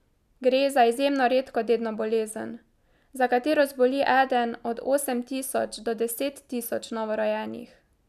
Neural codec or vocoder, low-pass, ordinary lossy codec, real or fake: none; 14.4 kHz; none; real